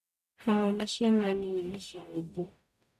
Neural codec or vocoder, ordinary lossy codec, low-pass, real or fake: codec, 44.1 kHz, 0.9 kbps, DAC; none; 14.4 kHz; fake